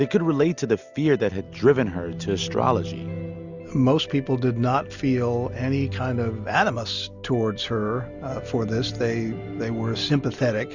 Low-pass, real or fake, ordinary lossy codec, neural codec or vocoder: 7.2 kHz; real; Opus, 64 kbps; none